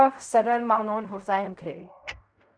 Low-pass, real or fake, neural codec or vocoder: 9.9 kHz; fake; codec, 16 kHz in and 24 kHz out, 0.4 kbps, LongCat-Audio-Codec, fine tuned four codebook decoder